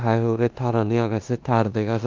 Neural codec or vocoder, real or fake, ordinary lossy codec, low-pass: codec, 16 kHz in and 24 kHz out, 0.9 kbps, LongCat-Audio-Codec, four codebook decoder; fake; Opus, 32 kbps; 7.2 kHz